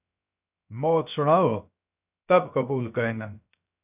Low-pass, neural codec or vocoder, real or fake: 3.6 kHz; codec, 16 kHz, 0.3 kbps, FocalCodec; fake